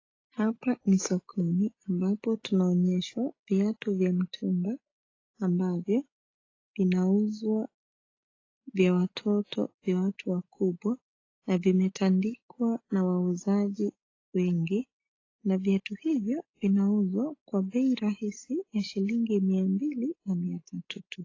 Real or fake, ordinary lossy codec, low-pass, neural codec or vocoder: real; AAC, 32 kbps; 7.2 kHz; none